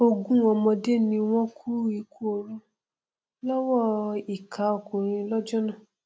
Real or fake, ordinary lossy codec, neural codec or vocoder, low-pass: real; none; none; none